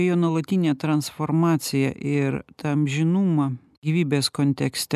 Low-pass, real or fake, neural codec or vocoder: 14.4 kHz; real; none